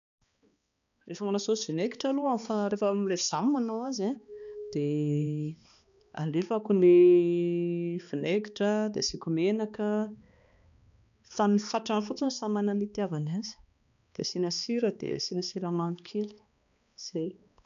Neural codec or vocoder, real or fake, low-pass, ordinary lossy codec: codec, 16 kHz, 2 kbps, X-Codec, HuBERT features, trained on balanced general audio; fake; 7.2 kHz; none